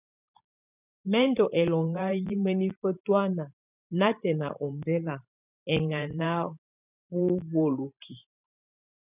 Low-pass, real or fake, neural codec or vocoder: 3.6 kHz; fake; vocoder, 44.1 kHz, 128 mel bands every 512 samples, BigVGAN v2